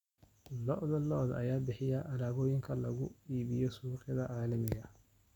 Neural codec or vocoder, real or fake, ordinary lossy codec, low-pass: none; real; none; 19.8 kHz